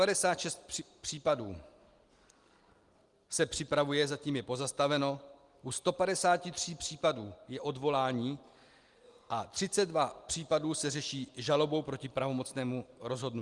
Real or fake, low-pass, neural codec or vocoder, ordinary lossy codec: real; 10.8 kHz; none; Opus, 24 kbps